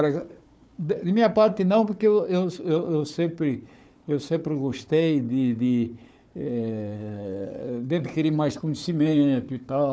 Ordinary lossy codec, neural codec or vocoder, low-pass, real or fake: none; codec, 16 kHz, 4 kbps, FunCodec, trained on Chinese and English, 50 frames a second; none; fake